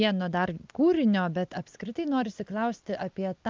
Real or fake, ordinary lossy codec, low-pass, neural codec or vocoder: real; Opus, 24 kbps; 7.2 kHz; none